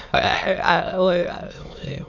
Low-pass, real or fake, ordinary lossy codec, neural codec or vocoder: 7.2 kHz; fake; none; autoencoder, 22.05 kHz, a latent of 192 numbers a frame, VITS, trained on many speakers